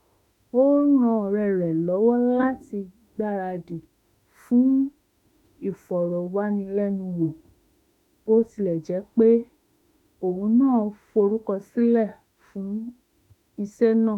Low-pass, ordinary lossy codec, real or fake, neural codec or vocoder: 19.8 kHz; none; fake; autoencoder, 48 kHz, 32 numbers a frame, DAC-VAE, trained on Japanese speech